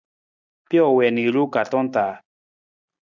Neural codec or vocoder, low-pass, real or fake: none; 7.2 kHz; real